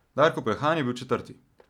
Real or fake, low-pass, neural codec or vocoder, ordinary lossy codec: real; 19.8 kHz; none; none